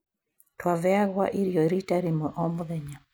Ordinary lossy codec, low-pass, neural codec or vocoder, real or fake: none; none; none; real